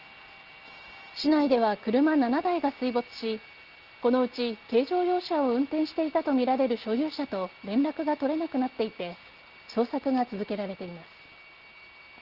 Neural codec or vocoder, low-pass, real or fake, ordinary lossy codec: none; 5.4 kHz; real; Opus, 16 kbps